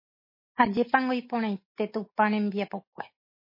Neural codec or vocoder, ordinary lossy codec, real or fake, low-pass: none; MP3, 24 kbps; real; 5.4 kHz